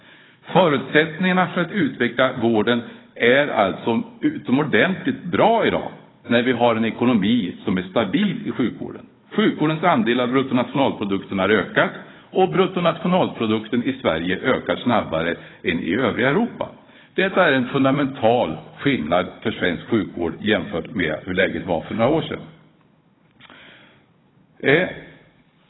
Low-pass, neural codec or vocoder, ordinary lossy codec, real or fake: 7.2 kHz; codec, 16 kHz, 16 kbps, FunCodec, trained on Chinese and English, 50 frames a second; AAC, 16 kbps; fake